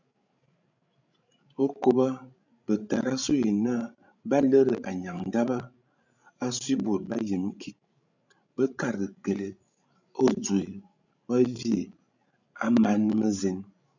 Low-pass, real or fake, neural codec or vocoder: 7.2 kHz; fake; codec, 16 kHz, 16 kbps, FreqCodec, larger model